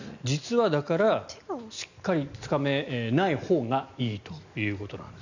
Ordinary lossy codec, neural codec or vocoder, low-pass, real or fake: none; none; 7.2 kHz; real